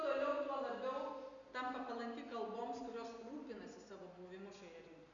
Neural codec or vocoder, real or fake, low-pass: none; real; 7.2 kHz